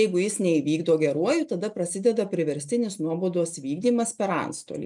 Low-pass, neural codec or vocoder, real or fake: 10.8 kHz; none; real